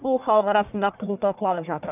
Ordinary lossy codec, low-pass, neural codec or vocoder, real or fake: none; 3.6 kHz; codec, 44.1 kHz, 1.7 kbps, Pupu-Codec; fake